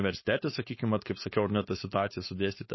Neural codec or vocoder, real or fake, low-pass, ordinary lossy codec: codec, 16 kHz, 4.8 kbps, FACodec; fake; 7.2 kHz; MP3, 24 kbps